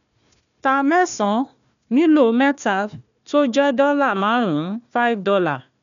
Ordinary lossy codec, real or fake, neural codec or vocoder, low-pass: none; fake; codec, 16 kHz, 1 kbps, FunCodec, trained on Chinese and English, 50 frames a second; 7.2 kHz